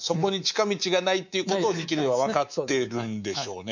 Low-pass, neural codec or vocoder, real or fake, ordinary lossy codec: 7.2 kHz; codec, 24 kHz, 3.1 kbps, DualCodec; fake; none